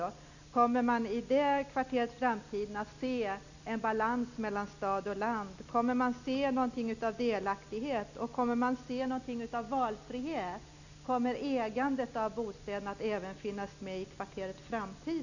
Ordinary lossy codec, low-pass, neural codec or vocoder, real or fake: none; 7.2 kHz; none; real